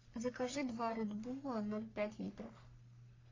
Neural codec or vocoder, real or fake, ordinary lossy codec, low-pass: codec, 44.1 kHz, 3.4 kbps, Pupu-Codec; fake; AAC, 32 kbps; 7.2 kHz